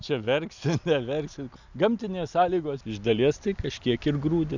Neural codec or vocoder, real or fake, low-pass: none; real; 7.2 kHz